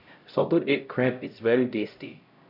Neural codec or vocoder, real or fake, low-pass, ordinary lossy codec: codec, 16 kHz, 0.5 kbps, X-Codec, HuBERT features, trained on LibriSpeech; fake; 5.4 kHz; none